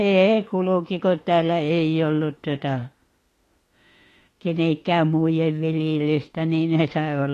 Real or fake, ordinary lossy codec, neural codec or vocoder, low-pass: fake; AAC, 48 kbps; autoencoder, 48 kHz, 32 numbers a frame, DAC-VAE, trained on Japanese speech; 14.4 kHz